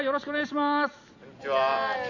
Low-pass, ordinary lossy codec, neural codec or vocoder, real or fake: 7.2 kHz; none; none; real